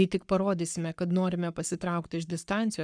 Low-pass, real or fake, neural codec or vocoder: 9.9 kHz; fake; codec, 44.1 kHz, 7.8 kbps, Pupu-Codec